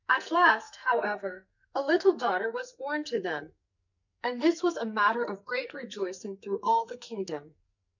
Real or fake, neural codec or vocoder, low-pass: fake; codec, 44.1 kHz, 2.6 kbps, SNAC; 7.2 kHz